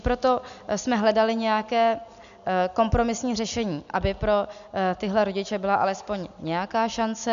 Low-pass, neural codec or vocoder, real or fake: 7.2 kHz; none; real